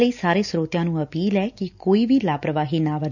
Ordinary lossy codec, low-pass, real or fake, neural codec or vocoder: none; 7.2 kHz; real; none